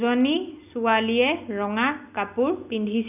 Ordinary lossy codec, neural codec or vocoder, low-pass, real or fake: none; none; 3.6 kHz; real